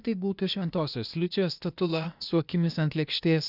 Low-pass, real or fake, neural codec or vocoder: 5.4 kHz; fake; codec, 16 kHz, 0.8 kbps, ZipCodec